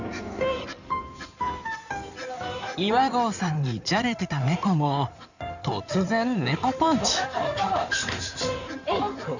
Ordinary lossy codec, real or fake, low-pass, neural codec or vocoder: none; fake; 7.2 kHz; codec, 16 kHz in and 24 kHz out, 2.2 kbps, FireRedTTS-2 codec